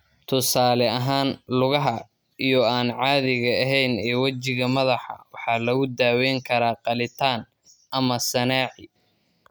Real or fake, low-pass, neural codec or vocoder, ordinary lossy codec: real; none; none; none